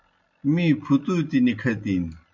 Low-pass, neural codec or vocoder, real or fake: 7.2 kHz; none; real